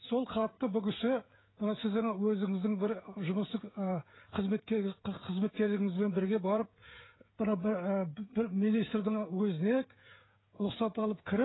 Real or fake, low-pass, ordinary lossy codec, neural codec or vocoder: fake; 7.2 kHz; AAC, 16 kbps; codec, 16 kHz, 16 kbps, FreqCodec, smaller model